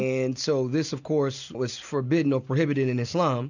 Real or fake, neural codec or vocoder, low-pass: real; none; 7.2 kHz